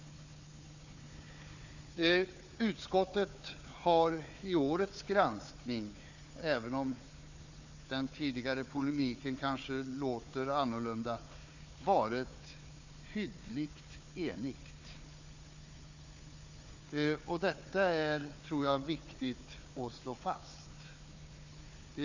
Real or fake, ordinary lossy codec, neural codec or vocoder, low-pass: fake; Opus, 64 kbps; codec, 16 kHz, 4 kbps, FunCodec, trained on Chinese and English, 50 frames a second; 7.2 kHz